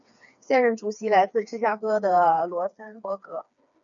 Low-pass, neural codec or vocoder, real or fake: 7.2 kHz; codec, 16 kHz, 4 kbps, FreqCodec, smaller model; fake